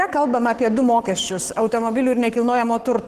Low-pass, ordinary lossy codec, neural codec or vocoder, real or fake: 14.4 kHz; Opus, 16 kbps; codec, 44.1 kHz, 7.8 kbps, Pupu-Codec; fake